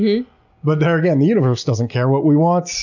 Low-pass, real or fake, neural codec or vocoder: 7.2 kHz; real; none